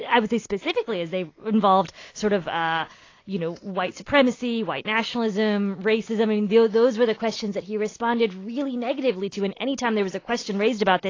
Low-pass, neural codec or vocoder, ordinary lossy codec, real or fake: 7.2 kHz; none; AAC, 32 kbps; real